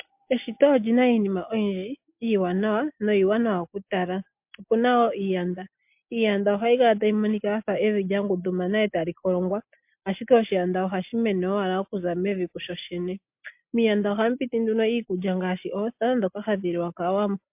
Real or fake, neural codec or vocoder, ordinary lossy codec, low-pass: real; none; MP3, 32 kbps; 3.6 kHz